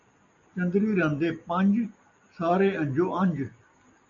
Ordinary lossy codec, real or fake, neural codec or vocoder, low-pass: MP3, 96 kbps; real; none; 7.2 kHz